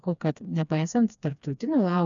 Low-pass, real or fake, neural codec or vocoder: 7.2 kHz; fake; codec, 16 kHz, 2 kbps, FreqCodec, smaller model